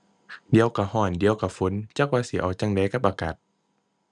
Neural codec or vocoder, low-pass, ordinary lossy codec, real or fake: none; none; none; real